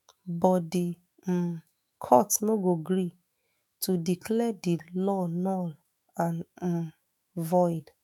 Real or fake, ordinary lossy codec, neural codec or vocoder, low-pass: fake; none; autoencoder, 48 kHz, 128 numbers a frame, DAC-VAE, trained on Japanese speech; 19.8 kHz